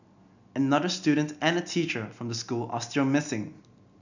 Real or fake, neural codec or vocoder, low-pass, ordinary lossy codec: real; none; 7.2 kHz; none